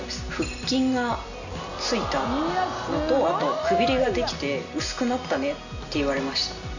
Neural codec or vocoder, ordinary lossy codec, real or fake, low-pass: none; none; real; 7.2 kHz